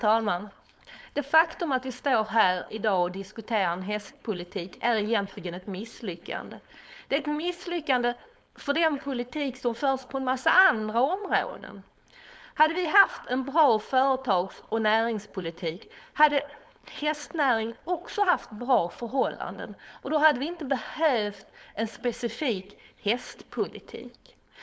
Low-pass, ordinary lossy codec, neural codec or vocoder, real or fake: none; none; codec, 16 kHz, 4.8 kbps, FACodec; fake